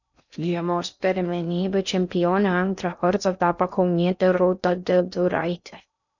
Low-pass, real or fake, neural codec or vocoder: 7.2 kHz; fake; codec, 16 kHz in and 24 kHz out, 0.6 kbps, FocalCodec, streaming, 2048 codes